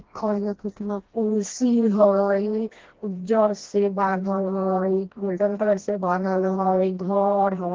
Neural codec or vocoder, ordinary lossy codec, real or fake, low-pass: codec, 16 kHz, 1 kbps, FreqCodec, smaller model; Opus, 16 kbps; fake; 7.2 kHz